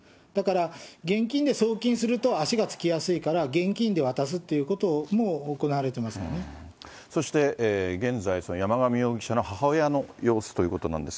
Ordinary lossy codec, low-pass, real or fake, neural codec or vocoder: none; none; real; none